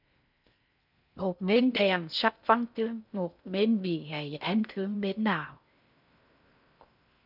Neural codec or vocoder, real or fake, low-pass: codec, 16 kHz in and 24 kHz out, 0.6 kbps, FocalCodec, streaming, 4096 codes; fake; 5.4 kHz